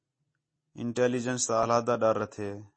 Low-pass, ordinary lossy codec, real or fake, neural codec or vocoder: 9.9 kHz; MP3, 32 kbps; real; none